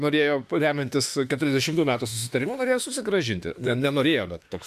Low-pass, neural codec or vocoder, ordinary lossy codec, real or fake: 14.4 kHz; autoencoder, 48 kHz, 32 numbers a frame, DAC-VAE, trained on Japanese speech; AAC, 96 kbps; fake